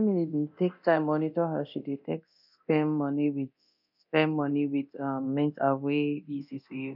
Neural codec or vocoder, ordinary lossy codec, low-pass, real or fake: codec, 24 kHz, 0.9 kbps, DualCodec; none; 5.4 kHz; fake